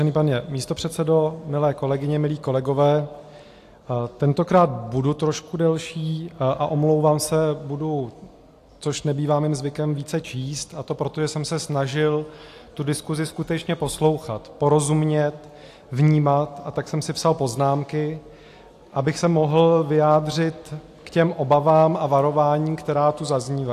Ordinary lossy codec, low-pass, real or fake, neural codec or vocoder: AAC, 64 kbps; 14.4 kHz; real; none